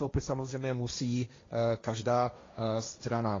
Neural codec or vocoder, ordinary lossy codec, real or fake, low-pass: codec, 16 kHz, 1.1 kbps, Voila-Tokenizer; AAC, 32 kbps; fake; 7.2 kHz